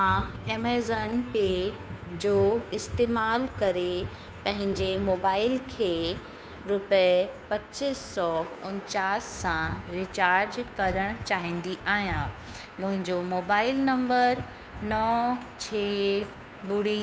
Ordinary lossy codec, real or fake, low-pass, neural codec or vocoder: none; fake; none; codec, 16 kHz, 2 kbps, FunCodec, trained on Chinese and English, 25 frames a second